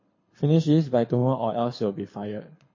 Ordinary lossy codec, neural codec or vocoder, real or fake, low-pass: MP3, 32 kbps; codec, 24 kHz, 6 kbps, HILCodec; fake; 7.2 kHz